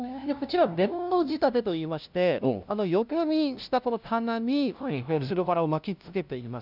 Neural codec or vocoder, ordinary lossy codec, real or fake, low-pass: codec, 16 kHz, 0.5 kbps, FunCodec, trained on LibriTTS, 25 frames a second; none; fake; 5.4 kHz